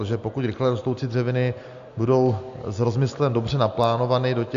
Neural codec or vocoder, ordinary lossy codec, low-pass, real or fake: none; AAC, 64 kbps; 7.2 kHz; real